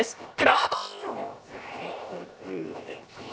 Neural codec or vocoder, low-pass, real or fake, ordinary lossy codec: codec, 16 kHz, 0.7 kbps, FocalCodec; none; fake; none